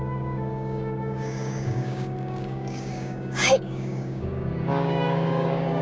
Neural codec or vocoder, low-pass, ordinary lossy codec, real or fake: codec, 16 kHz, 6 kbps, DAC; none; none; fake